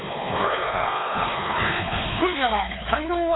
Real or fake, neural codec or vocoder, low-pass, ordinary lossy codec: fake; codec, 16 kHz, 2 kbps, X-Codec, WavLM features, trained on Multilingual LibriSpeech; 7.2 kHz; AAC, 16 kbps